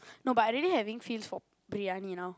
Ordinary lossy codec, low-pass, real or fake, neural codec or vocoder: none; none; real; none